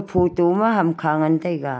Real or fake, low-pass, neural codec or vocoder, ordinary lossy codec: real; none; none; none